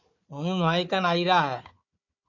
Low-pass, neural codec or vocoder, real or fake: 7.2 kHz; codec, 16 kHz, 4 kbps, FunCodec, trained on Chinese and English, 50 frames a second; fake